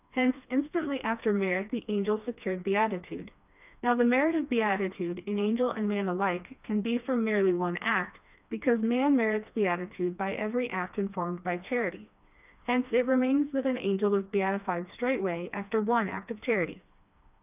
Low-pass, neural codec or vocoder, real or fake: 3.6 kHz; codec, 16 kHz, 2 kbps, FreqCodec, smaller model; fake